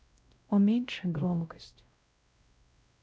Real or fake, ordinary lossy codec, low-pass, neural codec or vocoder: fake; none; none; codec, 16 kHz, 0.5 kbps, X-Codec, WavLM features, trained on Multilingual LibriSpeech